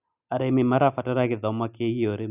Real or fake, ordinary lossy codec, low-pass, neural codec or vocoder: real; none; 3.6 kHz; none